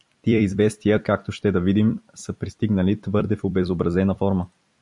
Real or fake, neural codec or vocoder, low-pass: fake; vocoder, 44.1 kHz, 128 mel bands every 256 samples, BigVGAN v2; 10.8 kHz